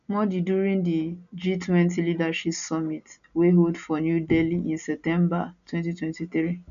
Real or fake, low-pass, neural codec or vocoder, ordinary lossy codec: real; 7.2 kHz; none; none